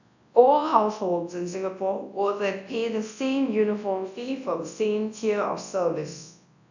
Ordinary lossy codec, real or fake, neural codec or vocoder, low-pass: none; fake; codec, 24 kHz, 0.9 kbps, WavTokenizer, large speech release; 7.2 kHz